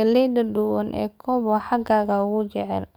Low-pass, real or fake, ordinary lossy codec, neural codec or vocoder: none; fake; none; codec, 44.1 kHz, 7.8 kbps, DAC